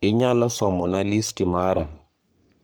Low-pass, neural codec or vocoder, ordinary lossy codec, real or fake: none; codec, 44.1 kHz, 3.4 kbps, Pupu-Codec; none; fake